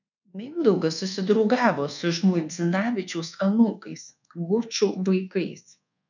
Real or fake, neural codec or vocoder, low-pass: fake; codec, 24 kHz, 1.2 kbps, DualCodec; 7.2 kHz